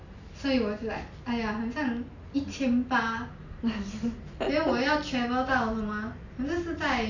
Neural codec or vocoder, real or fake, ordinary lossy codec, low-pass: none; real; none; 7.2 kHz